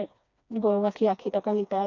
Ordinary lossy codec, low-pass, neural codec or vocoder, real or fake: none; 7.2 kHz; codec, 16 kHz, 2 kbps, FreqCodec, smaller model; fake